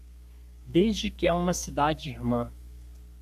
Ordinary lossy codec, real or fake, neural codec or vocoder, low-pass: AAC, 96 kbps; fake; codec, 32 kHz, 1.9 kbps, SNAC; 14.4 kHz